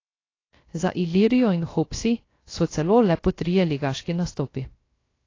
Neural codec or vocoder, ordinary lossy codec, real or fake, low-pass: codec, 16 kHz, 0.3 kbps, FocalCodec; AAC, 32 kbps; fake; 7.2 kHz